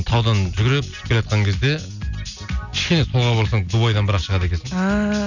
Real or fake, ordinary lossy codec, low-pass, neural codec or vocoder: real; none; 7.2 kHz; none